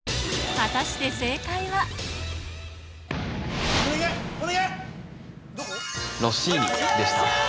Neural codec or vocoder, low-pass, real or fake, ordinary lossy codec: none; none; real; none